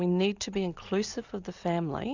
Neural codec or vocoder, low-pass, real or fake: none; 7.2 kHz; real